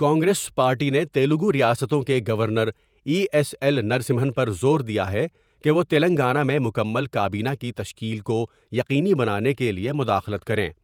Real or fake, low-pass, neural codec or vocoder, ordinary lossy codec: fake; 19.8 kHz; vocoder, 48 kHz, 128 mel bands, Vocos; none